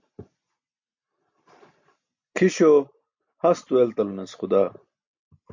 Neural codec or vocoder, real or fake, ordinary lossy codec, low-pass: none; real; MP3, 48 kbps; 7.2 kHz